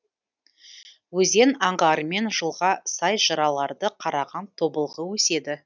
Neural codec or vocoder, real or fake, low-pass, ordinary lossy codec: none; real; 7.2 kHz; none